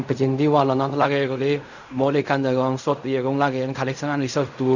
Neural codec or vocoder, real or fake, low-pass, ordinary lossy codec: codec, 16 kHz in and 24 kHz out, 0.4 kbps, LongCat-Audio-Codec, fine tuned four codebook decoder; fake; 7.2 kHz; none